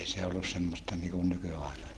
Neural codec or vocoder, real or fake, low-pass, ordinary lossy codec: none; real; 10.8 kHz; Opus, 16 kbps